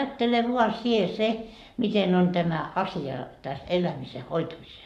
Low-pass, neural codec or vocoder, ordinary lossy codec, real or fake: 14.4 kHz; codec, 44.1 kHz, 7.8 kbps, Pupu-Codec; none; fake